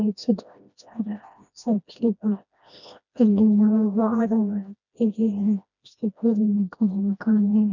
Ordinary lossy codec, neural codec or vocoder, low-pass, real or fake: none; codec, 16 kHz, 1 kbps, FreqCodec, smaller model; 7.2 kHz; fake